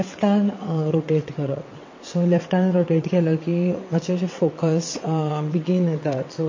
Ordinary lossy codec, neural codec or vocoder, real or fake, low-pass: MP3, 32 kbps; vocoder, 22.05 kHz, 80 mel bands, WaveNeXt; fake; 7.2 kHz